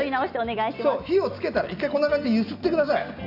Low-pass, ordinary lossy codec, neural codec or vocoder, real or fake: 5.4 kHz; none; none; real